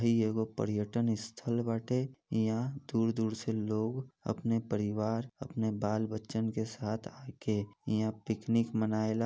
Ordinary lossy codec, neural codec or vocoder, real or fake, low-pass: none; none; real; none